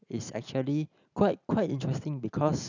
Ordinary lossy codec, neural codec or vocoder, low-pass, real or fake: none; none; 7.2 kHz; real